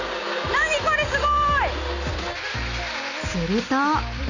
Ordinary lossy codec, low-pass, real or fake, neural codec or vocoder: none; 7.2 kHz; real; none